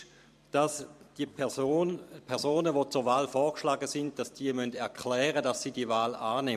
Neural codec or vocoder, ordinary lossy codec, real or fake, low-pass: vocoder, 44.1 kHz, 128 mel bands every 512 samples, BigVGAN v2; MP3, 64 kbps; fake; 14.4 kHz